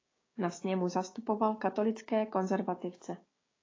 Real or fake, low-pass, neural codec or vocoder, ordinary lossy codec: fake; 7.2 kHz; codec, 16 kHz, 6 kbps, DAC; AAC, 32 kbps